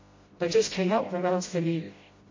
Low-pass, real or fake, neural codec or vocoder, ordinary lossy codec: 7.2 kHz; fake; codec, 16 kHz, 0.5 kbps, FreqCodec, smaller model; MP3, 32 kbps